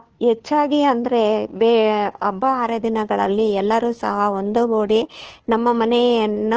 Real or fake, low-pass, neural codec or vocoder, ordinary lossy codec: fake; 7.2 kHz; codec, 16 kHz, 2 kbps, FunCodec, trained on Chinese and English, 25 frames a second; Opus, 16 kbps